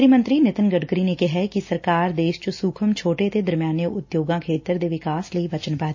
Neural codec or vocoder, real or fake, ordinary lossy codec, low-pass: none; real; MP3, 32 kbps; 7.2 kHz